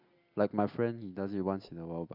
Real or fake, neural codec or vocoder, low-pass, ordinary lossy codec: real; none; 5.4 kHz; none